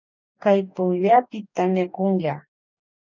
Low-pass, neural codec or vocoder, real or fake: 7.2 kHz; codec, 44.1 kHz, 2.6 kbps, DAC; fake